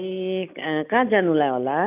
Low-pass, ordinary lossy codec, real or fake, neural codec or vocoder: 3.6 kHz; none; real; none